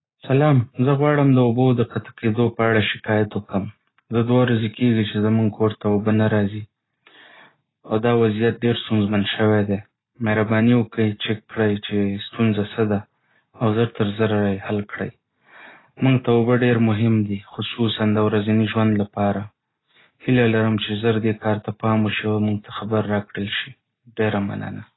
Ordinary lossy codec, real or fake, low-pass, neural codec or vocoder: AAC, 16 kbps; real; 7.2 kHz; none